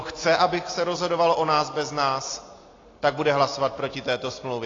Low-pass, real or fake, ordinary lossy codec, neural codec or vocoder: 7.2 kHz; real; AAC, 32 kbps; none